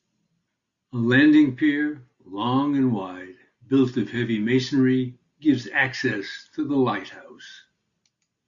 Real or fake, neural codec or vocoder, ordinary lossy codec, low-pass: real; none; Opus, 64 kbps; 7.2 kHz